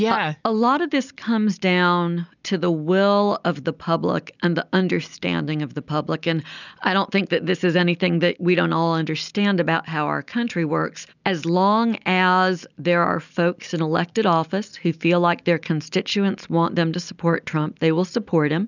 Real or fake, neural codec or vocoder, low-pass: real; none; 7.2 kHz